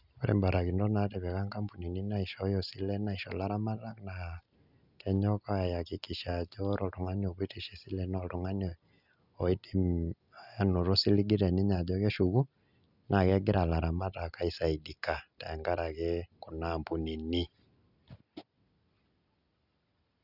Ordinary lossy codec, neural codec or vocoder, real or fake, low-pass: none; none; real; 5.4 kHz